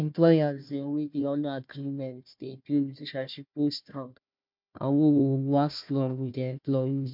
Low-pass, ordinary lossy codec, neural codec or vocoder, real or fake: 5.4 kHz; MP3, 48 kbps; codec, 16 kHz, 1 kbps, FunCodec, trained on Chinese and English, 50 frames a second; fake